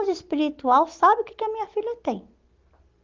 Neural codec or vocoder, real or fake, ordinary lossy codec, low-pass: none; real; Opus, 32 kbps; 7.2 kHz